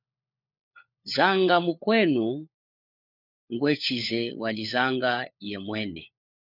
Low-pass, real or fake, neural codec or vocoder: 5.4 kHz; fake; codec, 16 kHz, 4 kbps, FunCodec, trained on LibriTTS, 50 frames a second